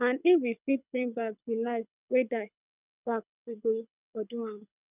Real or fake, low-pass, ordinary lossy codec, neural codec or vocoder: fake; 3.6 kHz; none; codec, 24 kHz, 6 kbps, HILCodec